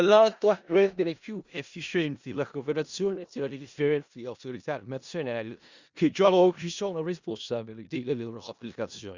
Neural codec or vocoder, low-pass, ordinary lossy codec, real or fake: codec, 16 kHz in and 24 kHz out, 0.4 kbps, LongCat-Audio-Codec, four codebook decoder; 7.2 kHz; Opus, 64 kbps; fake